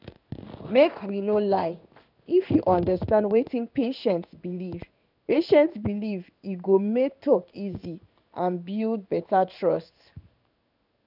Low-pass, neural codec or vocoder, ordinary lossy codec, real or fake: 5.4 kHz; codec, 16 kHz in and 24 kHz out, 1 kbps, XY-Tokenizer; none; fake